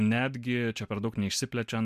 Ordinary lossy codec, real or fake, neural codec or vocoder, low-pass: MP3, 96 kbps; real; none; 14.4 kHz